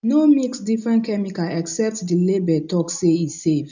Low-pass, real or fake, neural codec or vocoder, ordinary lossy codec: 7.2 kHz; real; none; none